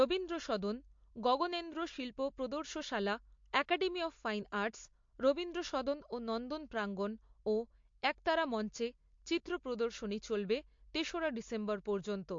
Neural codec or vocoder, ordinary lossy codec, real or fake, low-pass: none; MP3, 48 kbps; real; 7.2 kHz